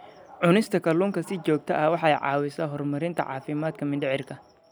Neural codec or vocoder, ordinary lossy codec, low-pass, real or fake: none; none; none; real